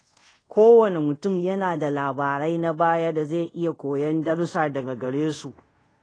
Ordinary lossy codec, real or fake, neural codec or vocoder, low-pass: AAC, 32 kbps; fake; codec, 24 kHz, 0.5 kbps, DualCodec; 9.9 kHz